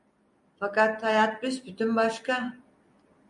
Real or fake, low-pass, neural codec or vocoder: real; 10.8 kHz; none